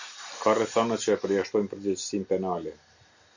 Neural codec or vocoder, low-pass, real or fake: none; 7.2 kHz; real